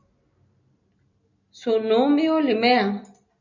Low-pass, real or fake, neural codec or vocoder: 7.2 kHz; real; none